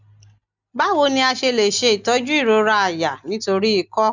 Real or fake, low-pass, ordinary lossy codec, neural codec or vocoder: real; 7.2 kHz; none; none